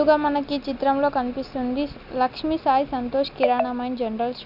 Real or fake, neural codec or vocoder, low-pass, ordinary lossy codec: real; none; 5.4 kHz; none